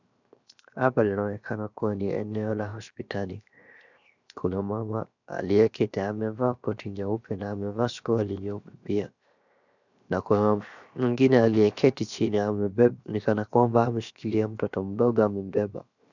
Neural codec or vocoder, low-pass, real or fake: codec, 16 kHz, 0.7 kbps, FocalCodec; 7.2 kHz; fake